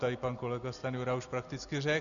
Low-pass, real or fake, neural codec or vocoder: 7.2 kHz; real; none